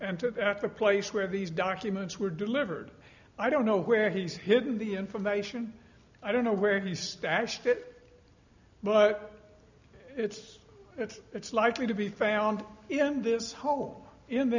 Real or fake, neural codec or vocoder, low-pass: real; none; 7.2 kHz